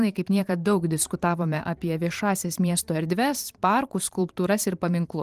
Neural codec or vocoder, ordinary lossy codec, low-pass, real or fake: vocoder, 44.1 kHz, 128 mel bands every 512 samples, BigVGAN v2; Opus, 24 kbps; 14.4 kHz; fake